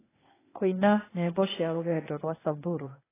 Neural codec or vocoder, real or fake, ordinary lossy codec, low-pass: codec, 16 kHz, 0.8 kbps, ZipCodec; fake; AAC, 16 kbps; 3.6 kHz